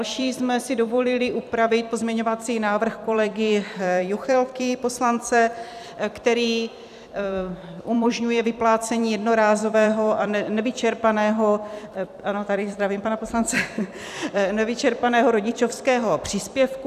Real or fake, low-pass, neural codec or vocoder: fake; 14.4 kHz; vocoder, 44.1 kHz, 128 mel bands every 256 samples, BigVGAN v2